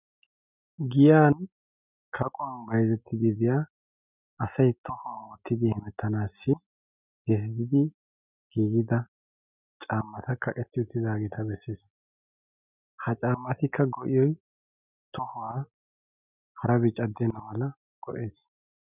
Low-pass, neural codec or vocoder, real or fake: 3.6 kHz; none; real